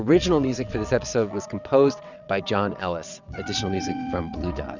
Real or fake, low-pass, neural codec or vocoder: fake; 7.2 kHz; vocoder, 22.05 kHz, 80 mel bands, WaveNeXt